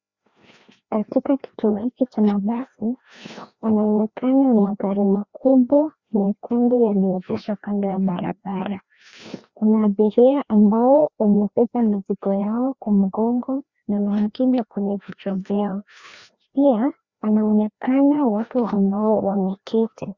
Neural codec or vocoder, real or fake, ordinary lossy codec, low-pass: codec, 16 kHz, 1 kbps, FreqCodec, larger model; fake; Opus, 64 kbps; 7.2 kHz